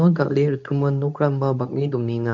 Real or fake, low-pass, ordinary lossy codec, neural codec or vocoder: fake; 7.2 kHz; none; codec, 24 kHz, 0.9 kbps, WavTokenizer, medium speech release version 2